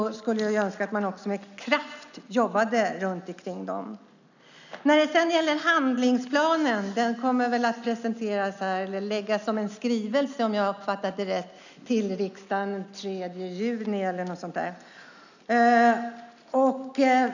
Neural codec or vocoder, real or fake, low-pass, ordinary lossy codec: none; real; 7.2 kHz; none